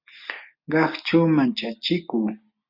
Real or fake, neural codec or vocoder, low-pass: real; none; 5.4 kHz